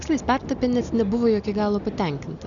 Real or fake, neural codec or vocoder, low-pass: real; none; 7.2 kHz